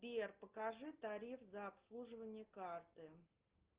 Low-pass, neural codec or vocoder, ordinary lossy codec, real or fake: 3.6 kHz; none; Opus, 32 kbps; real